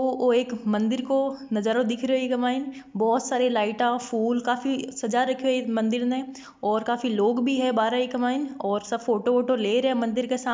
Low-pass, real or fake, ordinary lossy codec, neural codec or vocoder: none; real; none; none